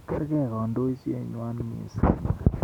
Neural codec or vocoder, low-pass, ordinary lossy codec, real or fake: none; 19.8 kHz; none; real